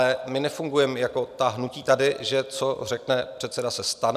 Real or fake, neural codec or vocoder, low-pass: real; none; 14.4 kHz